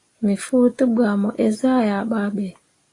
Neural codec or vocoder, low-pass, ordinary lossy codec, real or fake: none; 10.8 kHz; AAC, 32 kbps; real